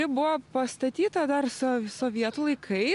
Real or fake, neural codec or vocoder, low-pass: real; none; 10.8 kHz